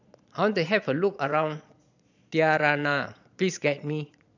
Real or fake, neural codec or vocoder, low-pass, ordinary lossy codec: fake; vocoder, 22.05 kHz, 80 mel bands, Vocos; 7.2 kHz; none